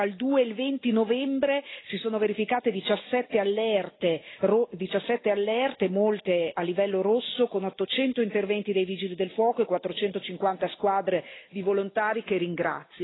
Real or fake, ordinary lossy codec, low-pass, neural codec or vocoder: real; AAC, 16 kbps; 7.2 kHz; none